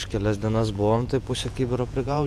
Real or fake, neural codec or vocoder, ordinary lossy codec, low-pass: fake; vocoder, 48 kHz, 128 mel bands, Vocos; MP3, 96 kbps; 14.4 kHz